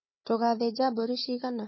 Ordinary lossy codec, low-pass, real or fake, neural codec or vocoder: MP3, 24 kbps; 7.2 kHz; fake; codec, 16 kHz, 4 kbps, FunCodec, trained on Chinese and English, 50 frames a second